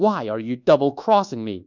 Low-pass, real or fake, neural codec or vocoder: 7.2 kHz; fake; codec, 24 kHz, 1.2 kbps, DualCodec